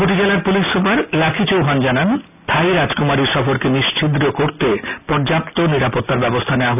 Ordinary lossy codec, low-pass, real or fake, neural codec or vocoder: none; 3.6 kHz; real; none